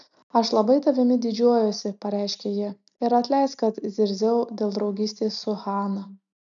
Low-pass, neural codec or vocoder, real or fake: 7.2 kHz; none; real